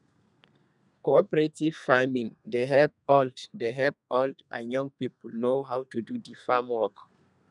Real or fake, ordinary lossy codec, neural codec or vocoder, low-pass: fake; none; codec, 32 kHz, 1.9 kbps, SNAC; 10.8 kHz